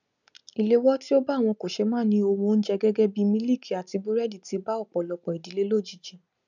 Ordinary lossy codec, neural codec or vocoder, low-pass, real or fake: none; none; 7.2 kHz; real